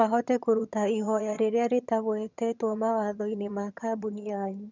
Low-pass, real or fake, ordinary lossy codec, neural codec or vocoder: 7.2 kHz; fake; none; vocoder, 22.05 kHz, 80 mel bands, HiFi-GAN